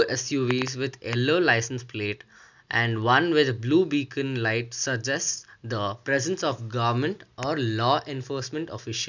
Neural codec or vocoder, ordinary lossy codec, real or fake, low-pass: none; none; real; 7.2 kHz